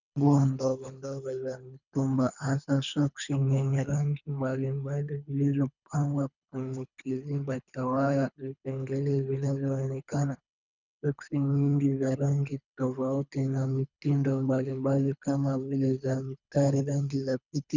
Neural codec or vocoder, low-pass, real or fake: codec, 24 kHz, 3 kbps, HILCodec; 7.2 kHz; fake